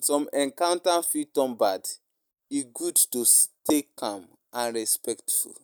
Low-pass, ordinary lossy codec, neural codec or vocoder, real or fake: none; none; none; real